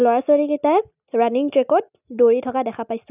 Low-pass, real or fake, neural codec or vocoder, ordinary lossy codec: 3.6 kHz; real; none; none